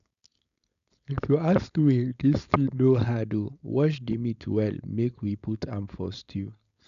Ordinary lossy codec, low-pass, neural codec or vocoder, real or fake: none; 7.2 kHz; codec, 16 kHz, 4.8 kbps, FACodec; fake